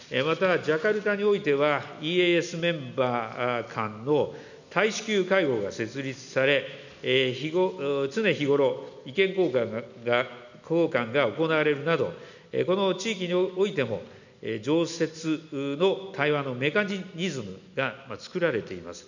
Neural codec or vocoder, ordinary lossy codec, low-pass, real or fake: none; none; 7.2 kHz; real